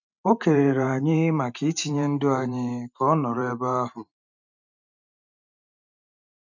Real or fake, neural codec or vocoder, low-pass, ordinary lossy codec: fake; vocoder, 44.1 kHz, 128 mel bands every 512 samples, BigVGAN v2; 7.2 kHz; none